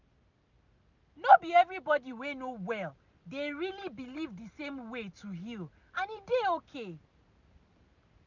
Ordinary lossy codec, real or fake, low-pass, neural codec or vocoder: none; real; 7.2 kHz; none